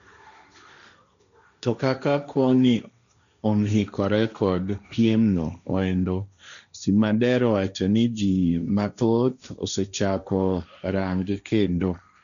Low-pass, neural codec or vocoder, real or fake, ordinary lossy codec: 7.2 kHz; codec, 16 kHz, 1.1 kbps, Voila-Tokenizer; fake; none